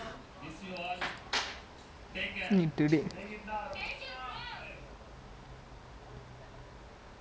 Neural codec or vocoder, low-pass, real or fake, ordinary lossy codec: none; none; real; none